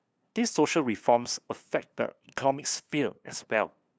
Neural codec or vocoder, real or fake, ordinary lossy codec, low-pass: codec, 16 kHz, 2 kbps, FunCodec, trained on LibriTTS, 25 frames a second; fake; none; none